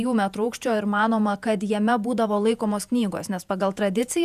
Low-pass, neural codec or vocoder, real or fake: 14.4 kHz; vocoder, 48 kHz, 128 mel bands, Vocos; fake